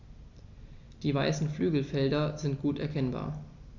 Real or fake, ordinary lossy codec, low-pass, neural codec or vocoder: real; none; 7.2 kHz; none